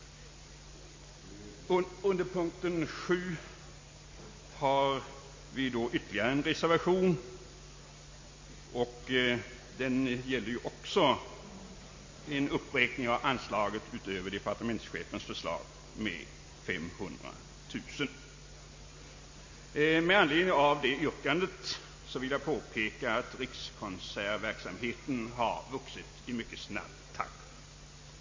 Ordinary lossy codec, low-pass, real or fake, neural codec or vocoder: MP3, 32 kbps; 7.2 kHz; real; none